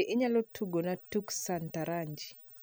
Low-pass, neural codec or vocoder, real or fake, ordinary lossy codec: none; none; real; none